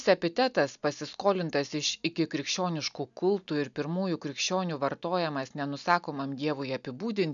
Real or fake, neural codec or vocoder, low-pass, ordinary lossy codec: real; none; 7.2 kHz; MP3, 96 kbps